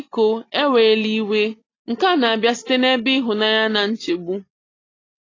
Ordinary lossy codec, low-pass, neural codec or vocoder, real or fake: AAC, 32 kbps; 7.2 kHz; none; real